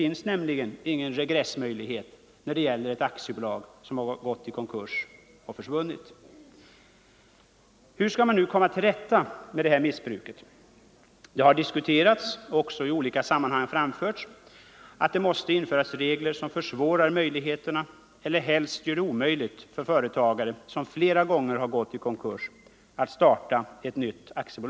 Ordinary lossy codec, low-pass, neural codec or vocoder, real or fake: none; none; none; real